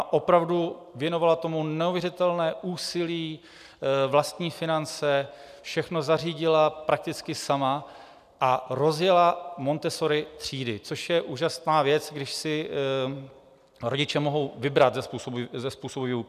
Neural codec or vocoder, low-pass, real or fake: none; 14.4 kHz; real